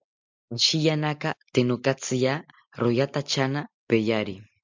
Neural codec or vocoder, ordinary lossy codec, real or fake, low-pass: none; MP3, 64 kbps; real; 7.2 kHz